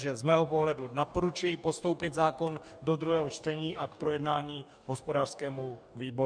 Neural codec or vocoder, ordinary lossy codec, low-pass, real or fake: codec, 44.1 kHz, 2.6 kbps, DAC; MP3, 96 kbps; 9.9 kHz; fake